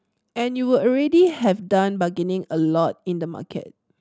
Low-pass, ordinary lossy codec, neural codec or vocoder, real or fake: none; none; none; real